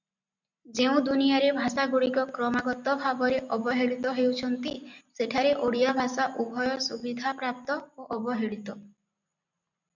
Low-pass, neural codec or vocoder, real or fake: 7.2 kHz; none; real